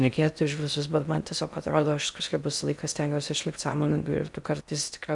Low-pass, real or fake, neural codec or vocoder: 10.8 kHz; fake; codec, 16 kHz in and 24 kHz out, 0.6 kbps, FocalCodec, streaming, 4096 codes